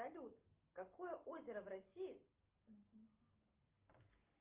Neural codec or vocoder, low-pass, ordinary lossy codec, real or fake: none; 3.6 kHz; Opus, 32 kbps; real